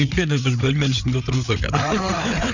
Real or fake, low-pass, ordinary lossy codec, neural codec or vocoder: fake; 7.2 kHz; none; codec, 16 kHz, 8 kbps, FreqCodec, larger model